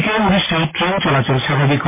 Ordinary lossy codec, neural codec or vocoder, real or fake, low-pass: MP3, 16 kbps; none; real; 3.6 kHz